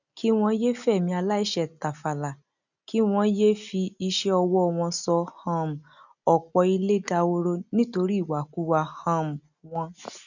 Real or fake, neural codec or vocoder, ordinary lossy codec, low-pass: real; none; none; 7.2 kHz